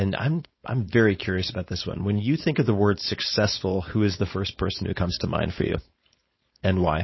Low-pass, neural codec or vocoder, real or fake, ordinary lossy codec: 7.2 kHz; codec, 16 kHz, 4.8 kbps, FACodec; fake; MP3, 24 kbps